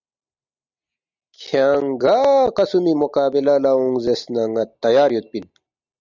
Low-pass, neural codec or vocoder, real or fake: 7.2 kHz; none; real